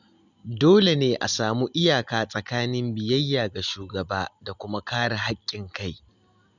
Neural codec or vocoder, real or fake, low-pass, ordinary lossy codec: none; real; 7.2 kHz; none